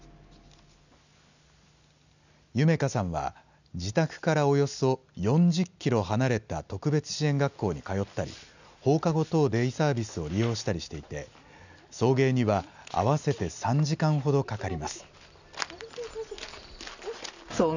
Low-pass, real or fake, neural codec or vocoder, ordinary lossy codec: 7.2 kHz; real; none; none